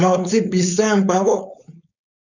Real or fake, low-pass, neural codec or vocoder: fake; 7.2 kHz; codec, 16 kHz, 4.8 kbps, FACodec